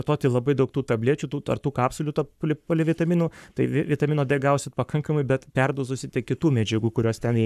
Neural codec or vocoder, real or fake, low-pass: codec, 44.1 kHz, 7.8 kbps, Pupu-Codec; fake; 14.4 kHz